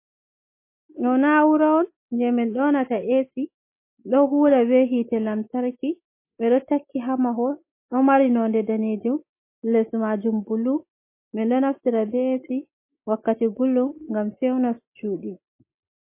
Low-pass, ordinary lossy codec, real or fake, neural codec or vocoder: 3.6 kHz; MP3, 24 kbps; real; none